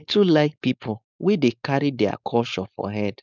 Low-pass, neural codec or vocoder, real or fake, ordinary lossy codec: 7.2 kHz; codec, 16 kHz, 4.8 kbps, FACodec; fake; none